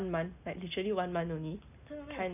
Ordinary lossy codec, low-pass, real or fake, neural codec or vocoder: none; 3.6 kHz; real; none